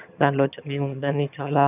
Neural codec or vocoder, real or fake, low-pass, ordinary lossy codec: vocoder, 22.05 kHz, 80 mel bands, HiFi-GAN; fake; 3.6 kHz; none